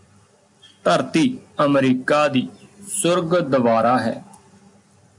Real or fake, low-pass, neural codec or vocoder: real; 10.8 kHz; none